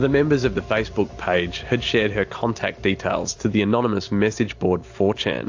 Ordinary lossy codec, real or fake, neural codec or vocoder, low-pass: AAC, 48 kbps; real; none; 7.2 kHz